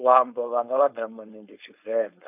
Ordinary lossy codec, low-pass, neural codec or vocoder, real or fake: none; 3.6 kHz; codec, 16 kHz, 4.8 kbps, FACodec; fake